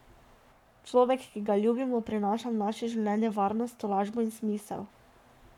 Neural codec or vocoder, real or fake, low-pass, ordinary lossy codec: codec, 44.1 kHz, 7.8 kbps, Pupu-Codec; fake; 19.8 kHz; none